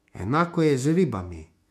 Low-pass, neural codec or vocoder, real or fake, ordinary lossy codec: 14.4 kHz; autoencoder, 48 kHz, 128 numbers a frame, DAC-VAE, trained on Japanese speech; fake; MP3, 64 kbps